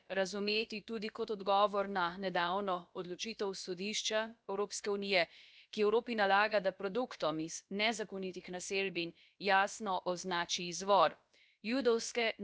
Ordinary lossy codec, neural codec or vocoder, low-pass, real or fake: none; codec, 16 kHz, about 1 kbps, DyCAST, with the encoder's durations; none; fake